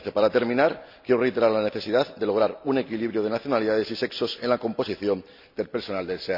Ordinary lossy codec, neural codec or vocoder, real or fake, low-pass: none; none; real; 5.4 kHz